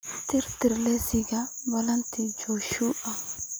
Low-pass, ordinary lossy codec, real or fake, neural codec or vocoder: none; none; real; none